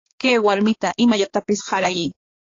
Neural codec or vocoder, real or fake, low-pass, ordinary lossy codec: codec, 16 kHz, 2 kbps, X-Codec, HuBERT features, trained on balanced general audio; fake; 7.2 kHz; AAC, 32 kbps